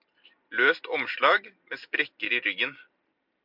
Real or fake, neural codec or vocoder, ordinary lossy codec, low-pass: real; none; MP3, 48 kbps; 5.4 kHz